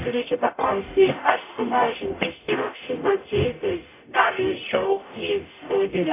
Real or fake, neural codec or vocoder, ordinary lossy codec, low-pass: fake; codec, 44.1 kHz, 0.9 kbps, DAC; AAC, 24 kbps; 3.6 kHz